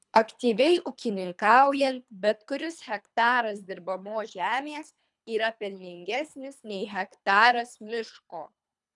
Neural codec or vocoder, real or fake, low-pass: codec, 24 kHz, 3 kbps, HILCodec; fake; 10.8 kHz